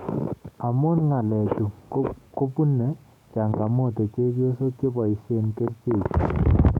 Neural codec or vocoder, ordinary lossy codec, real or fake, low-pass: codec, 44.1 kHz, 7.8 kbps, DAC; none; fake; 19.8 kHz